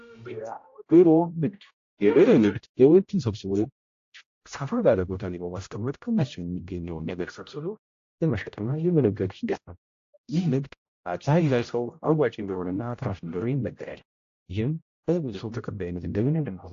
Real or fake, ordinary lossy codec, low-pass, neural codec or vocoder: fake; AAC, 48 kbps; 7.2 kHz; codec, 16 kHz, 0.5 kbps, X-Codec, HuBERT features, trained on general audio